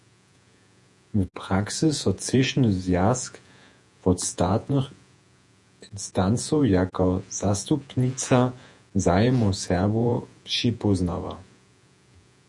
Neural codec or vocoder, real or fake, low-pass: vocoder, 48 kHz, 128 mel bands, Vocos; fake; 10.8 kHz